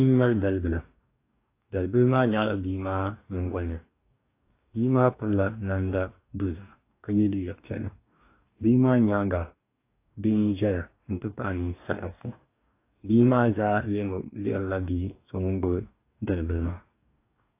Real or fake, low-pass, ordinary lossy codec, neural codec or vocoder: fake; 3.6 kHz; AAC, 24 kbps; codec, 44.1 kHz, 2.6 kbps, DAC